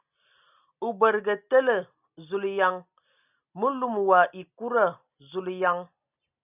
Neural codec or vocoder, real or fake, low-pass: none; real; 3.6 kHz